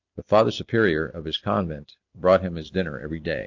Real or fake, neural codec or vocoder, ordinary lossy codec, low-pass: real; none; AAC, 48 kbps; 7.2 kHz